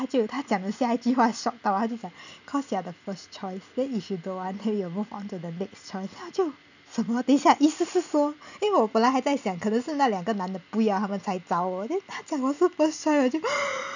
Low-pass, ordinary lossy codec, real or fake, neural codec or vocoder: 7.2 kHz; none; real; none